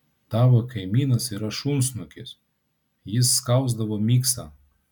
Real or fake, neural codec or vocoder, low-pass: real; none; 19.8 kHz